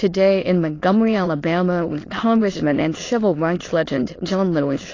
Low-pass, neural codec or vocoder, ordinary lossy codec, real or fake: 7.2 kHz; autoencoder, 22.05 kHz, a latent of 192 numbers a frame, VITS, trained on many speakers; AAC, 32 kbps; fake